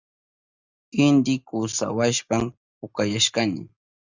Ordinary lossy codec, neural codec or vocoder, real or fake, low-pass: Opus, 64 kbps; none; real; 7.2 kHz